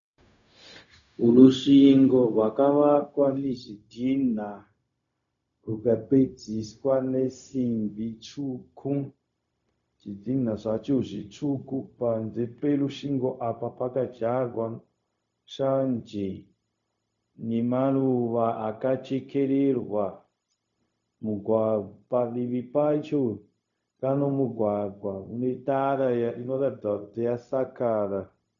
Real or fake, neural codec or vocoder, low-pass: fake; codec, 16 kHz, 0.4 kbps, LongCat-Audio-Codec; 7.2 kHz